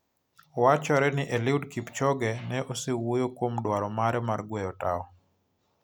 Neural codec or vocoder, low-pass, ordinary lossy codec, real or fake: none; none; none; real